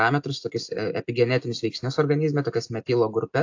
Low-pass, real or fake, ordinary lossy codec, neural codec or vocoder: 7.2 kHz; real; AAC, 48 kbps; none